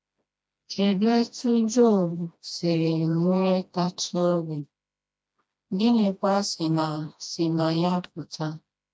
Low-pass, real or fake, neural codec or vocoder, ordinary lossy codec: none; fake; codec, 16 kHz, 1 kbps, FreqCodec, smaller model; none